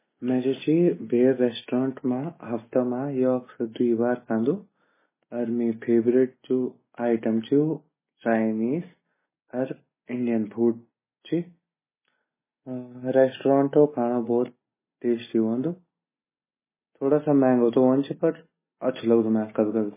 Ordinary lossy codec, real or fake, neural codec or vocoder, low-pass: MP3, 16 kbps; real; none; 3.6 kHz